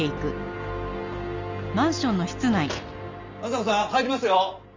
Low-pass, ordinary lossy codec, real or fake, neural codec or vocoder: 7.2 kHz; MP3, 64 kbps; real; none